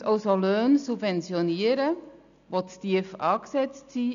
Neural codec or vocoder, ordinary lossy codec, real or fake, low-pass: none; none; real; 7.2 kHz